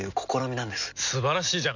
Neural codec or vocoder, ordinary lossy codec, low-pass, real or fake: none; none; 7.2 kHz; real